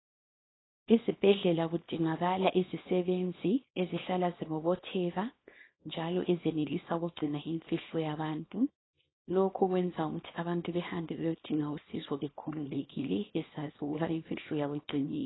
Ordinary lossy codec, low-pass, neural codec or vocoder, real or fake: AAC, 16 kbps; 7.2 kHz; codec, 24 kHz, 0.9 kbps, WavTokenizer, small release; fake